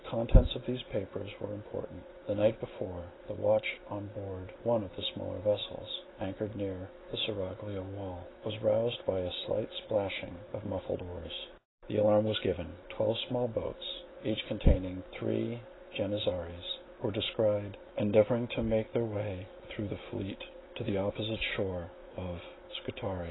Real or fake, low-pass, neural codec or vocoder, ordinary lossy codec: real; 7.2 kHz; none; AAC, 16 kbps